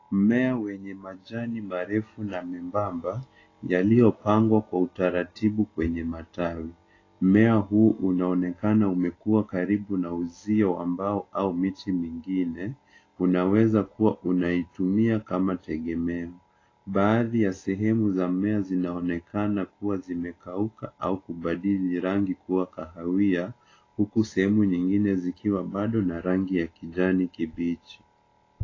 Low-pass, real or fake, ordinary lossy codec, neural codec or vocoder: 7.2 kHz; real; AAC, 32 kbps; none